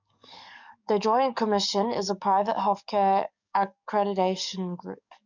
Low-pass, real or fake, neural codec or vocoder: 7.2 kHz; fake; codec, 44.1 kHz, 7.8 kbps, DAC